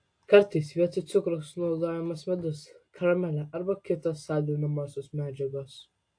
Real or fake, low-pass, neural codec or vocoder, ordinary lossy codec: real; 9.9 kHz; none; AAC, 48 kbps